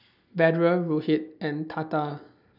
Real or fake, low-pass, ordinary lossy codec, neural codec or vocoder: real; 5.4 kHz; none; none